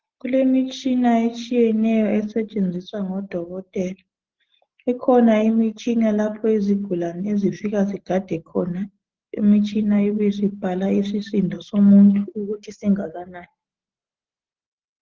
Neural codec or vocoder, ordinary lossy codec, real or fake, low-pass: none; Opus, 16 kbps; real; 7.2 kHz